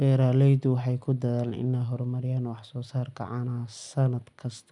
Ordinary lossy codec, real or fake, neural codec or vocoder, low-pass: none; real; none; 10.8 kHz